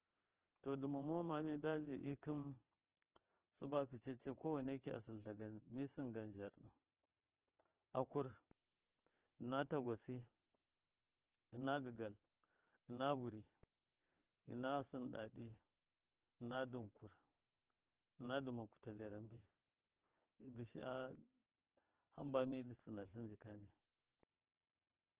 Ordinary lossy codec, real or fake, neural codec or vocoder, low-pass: Opus, 16 kbps; fake; vocoder, 22.05 kHz, 80 mel bands, WaveNeXt; 3.6 kHz